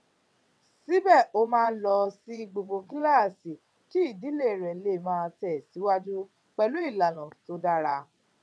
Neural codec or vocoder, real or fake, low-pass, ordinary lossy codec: vocoder, 22.05 kHz, 80 mel bands, WaveNeXt; fake; none; none